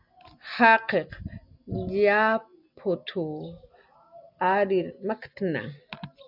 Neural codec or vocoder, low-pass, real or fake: none; 5.4 kHz; real